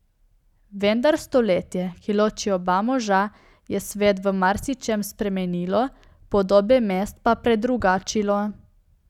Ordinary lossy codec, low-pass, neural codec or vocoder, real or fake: none; 19.8 kHz; none; real